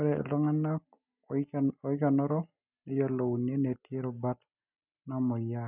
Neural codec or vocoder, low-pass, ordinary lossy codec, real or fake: none; 3.6 kHz; none; real